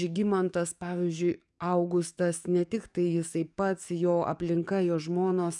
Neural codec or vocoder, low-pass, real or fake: codec, 44.1 kHz, 7.8 kbps, DAC; 10.8 kHz; fake